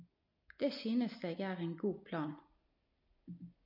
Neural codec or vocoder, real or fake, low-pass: none; real; 5.4 kHz